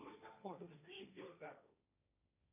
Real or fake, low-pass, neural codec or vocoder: fake; 3.6 kHz; codec, 16 kHz, 1.1 kbps, Voila-Tokenizer